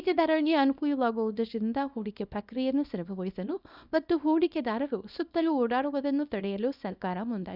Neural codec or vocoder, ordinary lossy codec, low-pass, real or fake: codec, 24 kHz, 0.9 kbps, WavTokenizer, small release; none; 5.4 kHz; fake